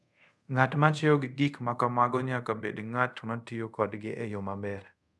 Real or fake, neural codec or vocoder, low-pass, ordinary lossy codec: fake; codec, 24 kHz, 0.5 kbps, DualCodec; none; none